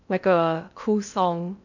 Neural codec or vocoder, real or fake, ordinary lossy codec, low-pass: codec, 16 kHz in and 24 kHz out, 0.6 kbps, FocalCodec, streaming, 2048 codes; fake; none; 7.2 kHz